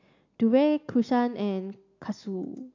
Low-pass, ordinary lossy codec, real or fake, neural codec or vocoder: 7.2 kHz; AAC, 48 kbps; real; none